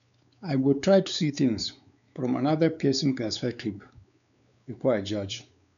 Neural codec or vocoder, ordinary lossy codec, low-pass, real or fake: codec, 16 kHz, 4 kbps, X-Codec, WavLM features, trained on Multilingual LibriSpeech; none; 7.2 kHz; fake